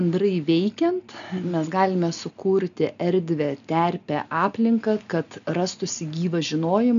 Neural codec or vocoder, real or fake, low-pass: none; real; 7.2 kHz